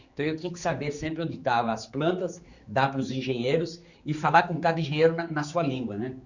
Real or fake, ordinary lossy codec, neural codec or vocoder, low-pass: fake; Opus, 64 kbps; codec, 16 kHz, 4 kbps, X-Codec, HuBERT features, trained on general audio; 7.2 kHz